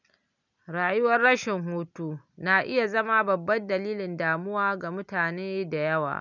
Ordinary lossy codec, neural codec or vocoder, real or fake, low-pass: none; none; real; 7.2 kHz